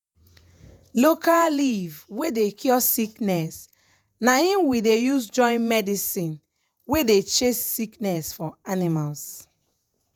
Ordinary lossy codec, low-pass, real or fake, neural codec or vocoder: none; none; fake; vocoder, 48 kHz, 128 mel bands, Vocos